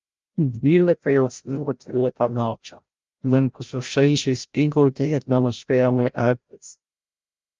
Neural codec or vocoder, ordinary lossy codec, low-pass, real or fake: codec, 16 kHz, 0.5 kbps, FreqCodec, larger model; Opus, 32 kbps; 7.2 kHz; fake